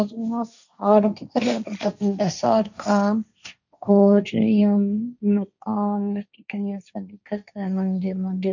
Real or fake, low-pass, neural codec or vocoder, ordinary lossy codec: fake; 7.2 kHz; codec, 16 kHz, 1.1 kbps, Voila-Tokenizer; none